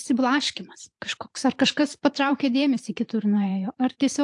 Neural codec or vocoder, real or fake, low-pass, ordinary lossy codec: vocoder, 44.1 kHz, 128 mel bands every 512 samples, BigVGAN v2; fake; 10.8 kHz; AAC, 64 kbps